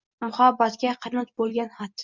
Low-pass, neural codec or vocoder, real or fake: 7.2 kHz; none; real